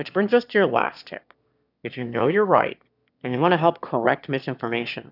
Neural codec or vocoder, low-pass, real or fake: autoencoder, 22.05 kHz, a latent of 192 numbers a frame, VITS, trained on one speaker; 5.4 kHz; fake